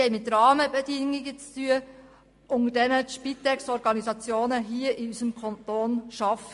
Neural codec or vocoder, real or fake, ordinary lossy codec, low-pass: none; real; MP3, 48 kbps; 14.4 kHz